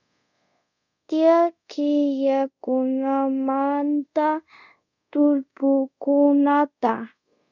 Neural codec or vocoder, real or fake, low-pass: codec, 24 kHz, 0.5 kbps, DualCodec; fake; 7.2 kHz